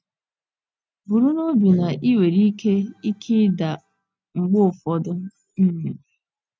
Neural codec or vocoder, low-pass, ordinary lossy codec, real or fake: none; none; none; real